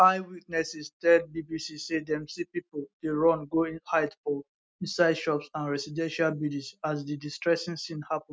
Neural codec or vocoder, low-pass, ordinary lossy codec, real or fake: none; none; none; real